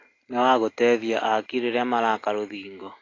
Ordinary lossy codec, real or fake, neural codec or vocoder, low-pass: AAC, 32 kbps; real; none; 7.2 kHz